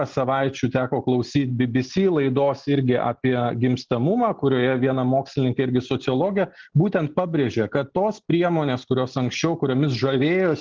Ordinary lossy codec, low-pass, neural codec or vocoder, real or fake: Opus, 16 kbps; 7.2 kHz; none; real